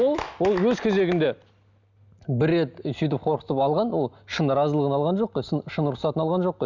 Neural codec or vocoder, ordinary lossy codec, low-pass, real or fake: none; none; 7.2 kHz; real